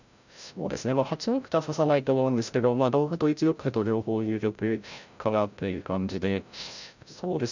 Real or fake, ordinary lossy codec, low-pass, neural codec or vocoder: fake; none; 7.2 kHz; codec, 16 kHz, 0.5 kbps, FreqCodec, larger model